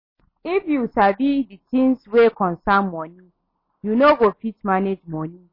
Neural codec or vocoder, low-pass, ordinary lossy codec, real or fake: none; 5.4 kHz; MP3, 24 kbps; real